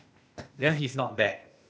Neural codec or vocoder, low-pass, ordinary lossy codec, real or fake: codec, 16 kHz, 0.8 kbps, ZipCodec; none; none; fake